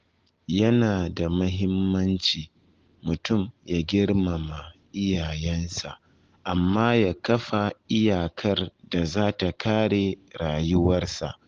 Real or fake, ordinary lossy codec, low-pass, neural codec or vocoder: real; Opus, 16 kbps; 7.2 kHz; none